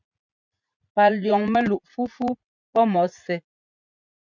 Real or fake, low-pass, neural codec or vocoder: fake; 7.2 kHz; vocoder, 44.1 kHz, 80 mel bands, Vocos